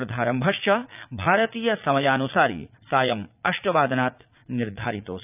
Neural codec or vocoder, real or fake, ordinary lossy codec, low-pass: vocoder, 22.05 kHz, 80 mel bands, Vocos; fake; none; 3.6 kHz